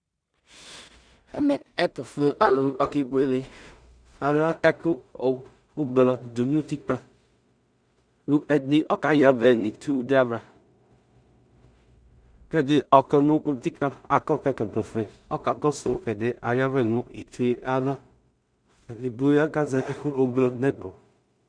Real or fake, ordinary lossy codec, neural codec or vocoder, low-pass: fake; Opus, 64 kbps; codec, 16 kHz in and 24 kHz out, 0.4 kbps, LongCat-Audio-Codec, two codebook decoder; 9.9 kHz